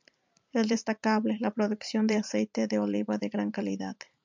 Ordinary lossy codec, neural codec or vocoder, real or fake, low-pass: MP3, 64 kbps; vocoder, 44.1 kHz, 128 mel bands every 256 samples, BigVGAN v2; fake; 7.2 kHz